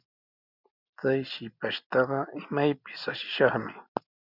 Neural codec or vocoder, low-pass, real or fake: none; 5.4 kHz; real